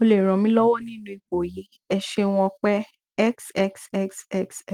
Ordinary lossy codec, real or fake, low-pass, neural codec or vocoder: Opus, 24 kbps; real; 19.8 kHz; none